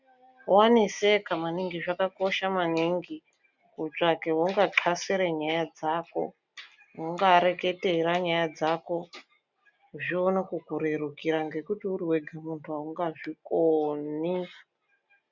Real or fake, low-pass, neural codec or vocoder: real; 7.2 kHz; none